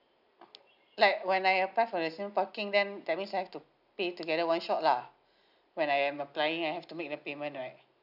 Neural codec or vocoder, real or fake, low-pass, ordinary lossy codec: none; real; 5.4 kHz; none